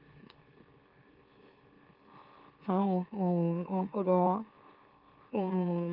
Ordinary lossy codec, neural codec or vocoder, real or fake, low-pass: Opus, 32 kbps; autoencoder, 44.1 kHz, a latent of 192 numbers a frame, MeloTTS; fake; 5.4 kHz